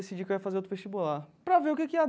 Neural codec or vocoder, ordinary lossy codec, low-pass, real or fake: none; none; none; real